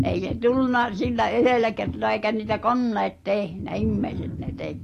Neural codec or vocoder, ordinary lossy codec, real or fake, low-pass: none; AAC, 48 kbps; real; 14.4 kHz